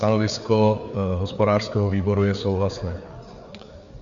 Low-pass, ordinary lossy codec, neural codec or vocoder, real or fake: 7.2 kHz; Opus, 64 kbps; codec, 16 kHz, 4 kbps, FreqCodec, larger model; fake